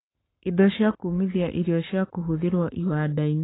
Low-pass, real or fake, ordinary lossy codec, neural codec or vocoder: 7.2 kHz; fake; AAC, 16 kbps; codec, 44.1 kHz, 7.8 kbps, Pupu-Codec